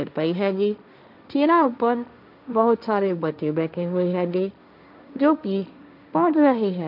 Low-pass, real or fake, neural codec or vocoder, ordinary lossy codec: 5.4 kHz; fake; codec, 16 kHz, 1.1 kbps, Voila-Tokenizer; none